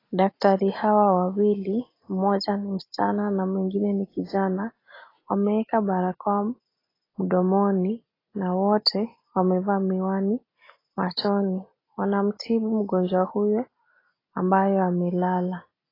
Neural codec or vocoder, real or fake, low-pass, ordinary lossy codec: none; real; 5.4 kHz; AAC, 24 kbps